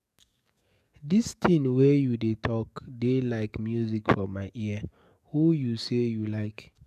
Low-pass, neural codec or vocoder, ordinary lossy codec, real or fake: 14.4 kHz; codec, 44.1 kHz, 7.8 kbps, DAC; none; fake